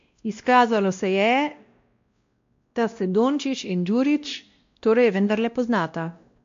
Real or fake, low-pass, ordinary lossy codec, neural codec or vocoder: fake; 7.2 kHz; MP3, 48 kbps; codec, 16 kHz, 1 kbps, X-Codec, WavLM features, trained on Multilingual LibriSpeech